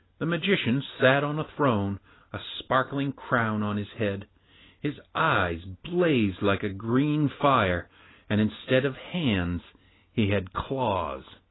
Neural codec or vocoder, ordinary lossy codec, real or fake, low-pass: none; AAC, 16 kbps; real; 7.2 kHz